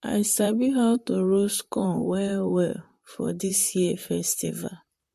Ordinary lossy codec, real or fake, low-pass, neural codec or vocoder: MP3, 64 kbps; fake; 14.4 kHz; vocoder, 44.1 kHz, 128 mel bands, Pupu-Vocoder